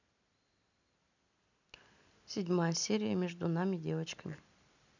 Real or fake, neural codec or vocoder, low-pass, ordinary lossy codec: real; none; 7.2 kHz; none